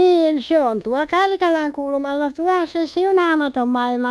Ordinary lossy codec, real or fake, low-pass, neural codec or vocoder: none; fake; none; codec, 24 kHz, 1.2 kbps, DualCodec